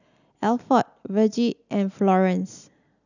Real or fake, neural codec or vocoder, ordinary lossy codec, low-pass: real; none; none; 7.2 kHz